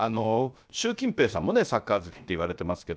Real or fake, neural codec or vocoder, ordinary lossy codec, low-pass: fake; codec, 16 kHz, about 1 kbps, DyCAST, with the encoder's durations; none; none